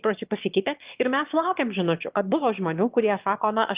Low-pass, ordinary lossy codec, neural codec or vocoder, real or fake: 3.6 kHz; Opus, 32 kbps; autoencoder, 22.05 kHz, a latent of 192 numbers a frame, VITS, trained on one speaker; fake